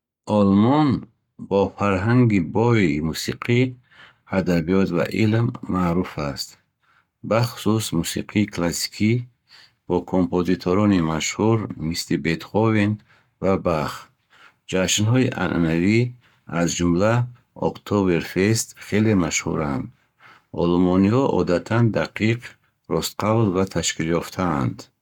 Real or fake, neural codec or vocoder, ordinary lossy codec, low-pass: fake; codec, 44.1 kHz, 7.8 kbps, Pupu-Codec; none; 19.8 kHz